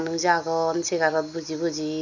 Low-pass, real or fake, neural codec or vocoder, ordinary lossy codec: 7.2 kHz; real; none; none